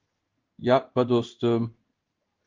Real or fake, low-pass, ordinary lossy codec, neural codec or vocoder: fake; 7.2 kHz; Opus, 32 kbps; codec, 16 kHz in and 24 kHz out, 1 kbps, XY-Tokenizer